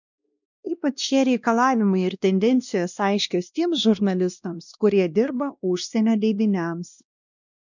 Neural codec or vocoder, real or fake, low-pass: codec, 16 kHz, 1 kbps, X-Codec, WavLM features, trained on Multilingual LibriSpeech; fake; 7.2 kHz